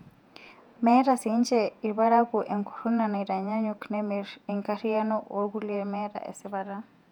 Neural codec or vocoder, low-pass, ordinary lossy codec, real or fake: vocoder, 48 kHz, 128 mel bands, Vocos; 19.8 kHz; none; fake